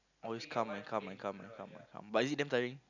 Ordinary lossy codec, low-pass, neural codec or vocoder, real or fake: none; 7.2 kHz; none; real